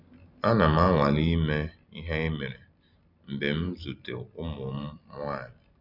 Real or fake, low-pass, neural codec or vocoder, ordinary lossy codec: real; 5.4 kHz; none; none